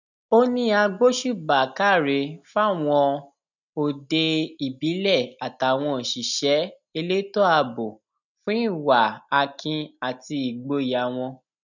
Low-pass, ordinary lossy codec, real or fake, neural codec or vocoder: 7.2 kHz; none; real; none